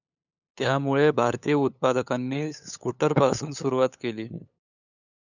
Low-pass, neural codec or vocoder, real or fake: 7.2 kHz; codec, 16 kHz, 8 kbps, FunCodec, trained on LibriTTS, 25 frames a second; fake